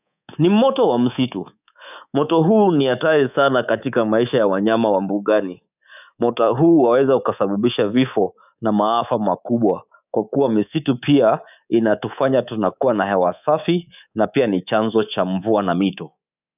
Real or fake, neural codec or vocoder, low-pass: fake; codec, 24 kHz, 3.1 kbps, DualCodec; 3.6 kHz